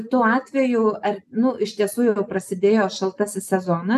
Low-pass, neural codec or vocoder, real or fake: 14.4 kHz; none; real